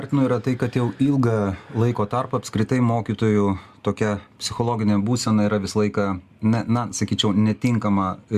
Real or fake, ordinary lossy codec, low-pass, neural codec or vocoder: real; Opus, 64 kbps; 14.4 kHz; none